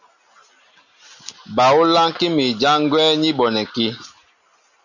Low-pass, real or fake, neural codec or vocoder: 7.2 kHz; real; none